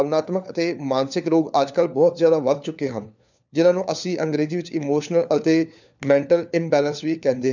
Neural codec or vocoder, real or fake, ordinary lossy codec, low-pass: codec, 16 kHz, 4 kbps, FunCodec, trained on LibriTTS, 50 frames a second; fake; none; 7.2 kHz